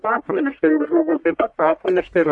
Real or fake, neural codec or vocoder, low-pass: fake; codec, 44.1 kHz, 1.7 kbps, Pupu-Codec; 10.8 kHz